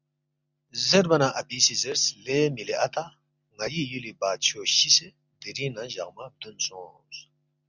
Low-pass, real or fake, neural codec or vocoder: 7.2 kHz; real; none